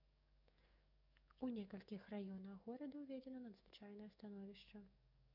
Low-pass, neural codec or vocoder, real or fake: 5.4 kHz; codec, 44.1 kHz, 7.8 kbps, DAC; fake